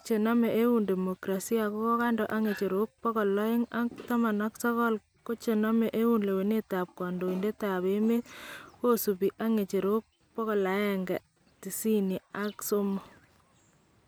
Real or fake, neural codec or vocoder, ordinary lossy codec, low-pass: real; none; none; none